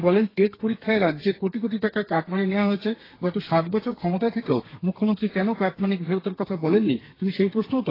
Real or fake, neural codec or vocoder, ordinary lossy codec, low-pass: fake; codec, 32 kHz, 1.9 kbps, SNAC; AAC, 24 kbps; 5.4 kHz